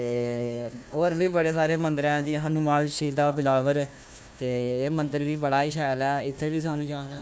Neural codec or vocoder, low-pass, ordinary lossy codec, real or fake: codec, 16 kHz, 1 kbps, FunCodec, trained on Chinese and English, 50 frames a second; none; none; fake